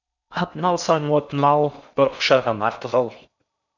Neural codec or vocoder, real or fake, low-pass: codec, 16 kHz in and 24 kHz out, 0.6 kbps, FocalCodec, streaming, 4096 codes; fake; 7.2 kHz